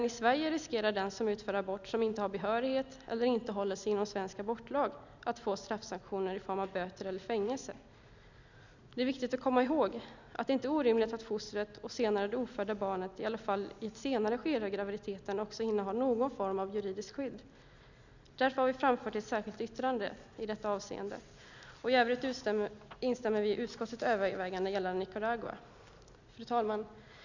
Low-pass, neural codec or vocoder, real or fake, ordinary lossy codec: 7.2 kHz; none; real; none